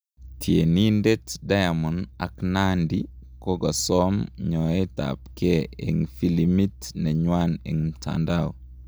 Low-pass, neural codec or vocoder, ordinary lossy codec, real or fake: none; none; none; real